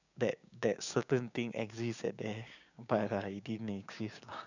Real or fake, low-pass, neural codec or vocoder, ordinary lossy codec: fake; 7.2 kHz; codec, 16 kHz, 6 kbps, DAC; none